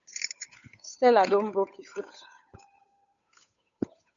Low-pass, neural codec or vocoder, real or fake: 7.2 kHz; codec, 16 kHz, 8 kbps, FunCodec, trained on Chinese and English, 25 frames a second; fake